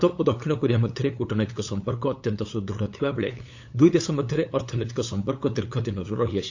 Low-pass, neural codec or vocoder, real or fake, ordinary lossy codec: 7.2 kHz; codec, 16 kHz, 8 kbps, FunCodec, trained on LibriTTS, 25 frames a second; fake; AAC, 48 kbps